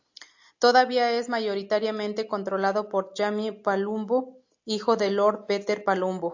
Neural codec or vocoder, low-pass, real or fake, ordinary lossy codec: none; 7.2 kHz; real; AAC, 48 kbps